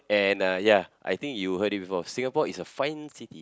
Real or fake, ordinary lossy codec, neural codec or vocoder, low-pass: real; none; none; none